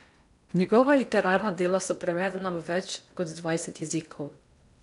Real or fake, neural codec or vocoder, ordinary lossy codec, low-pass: fake; codec, 16 kHz in and 24 kHz out, 0.8 kbps, FocalCodec, streaming, 65536 codes; none; 10.8 kHz